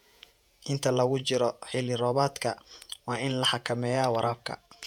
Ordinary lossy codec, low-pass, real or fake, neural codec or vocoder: none; 19.8 kHz; fake; vocoder, 48 kHz, 128 mel bands, Vocos